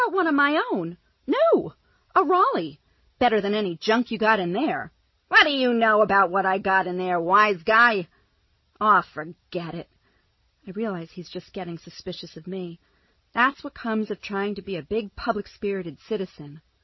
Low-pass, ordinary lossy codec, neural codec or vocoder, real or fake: 7.2 kHz; MP3, 24 kbps; none; real